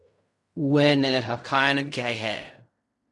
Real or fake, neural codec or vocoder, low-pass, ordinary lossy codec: fake; codec, 16 kHz in and 24 kHz out, 0.4 kbps, LongCat-Audio-Codec, fine tuned four codebook decoder; 10.8 kHz; MP3, 96 kbps